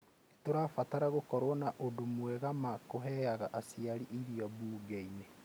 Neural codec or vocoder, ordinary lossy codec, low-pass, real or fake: none; none; none; real